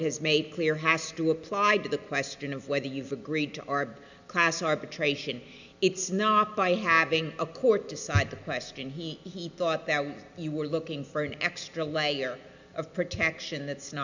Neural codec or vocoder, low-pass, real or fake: none; 7.2 kHz; real